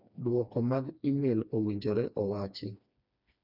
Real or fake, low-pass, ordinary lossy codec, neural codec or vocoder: fake; 5.4 kHz; none; codec, 16 kHz, 2 kbps, FreqCodec, smaller model